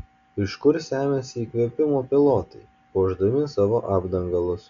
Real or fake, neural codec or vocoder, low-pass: real; none; 7.2 kHz